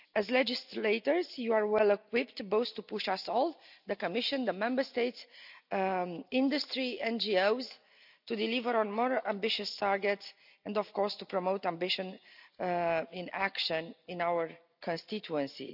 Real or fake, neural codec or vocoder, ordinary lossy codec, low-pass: real; none; none; 5.4 kHz